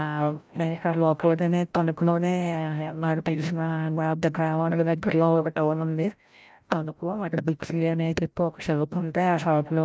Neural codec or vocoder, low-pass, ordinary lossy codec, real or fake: codec, 16 kHz, 0.5 kbps, FreqCodec, larger model; none; none; fake